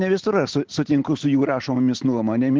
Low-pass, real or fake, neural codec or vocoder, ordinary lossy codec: 7.2 kHz; real; none; Opus, 16 kbps